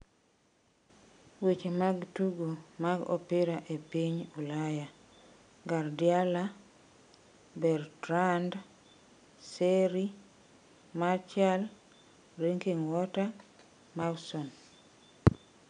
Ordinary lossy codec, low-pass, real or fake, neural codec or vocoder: none; 9.9 kHz; real; none